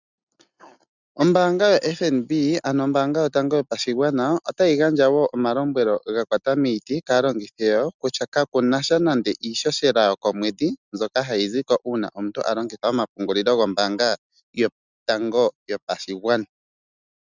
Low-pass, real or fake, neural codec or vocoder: 7.2 kHz; real; none